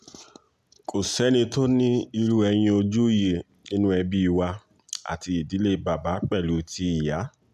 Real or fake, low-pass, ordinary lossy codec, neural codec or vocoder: real; 14.4 kHz; none; none